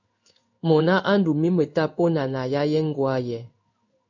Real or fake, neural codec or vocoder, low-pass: fake; codec, 16 kHz in and 24 kHz out, 1 kbps, XY-Tokenizer; 7.2 kHz